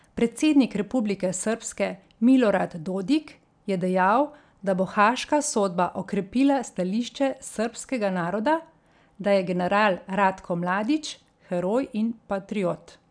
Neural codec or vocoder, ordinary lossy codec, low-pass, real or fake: none; none; 9.9 kHz; real